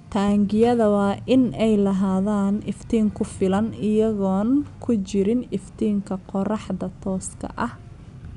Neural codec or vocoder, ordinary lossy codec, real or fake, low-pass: none; none; real; 10.8 kHz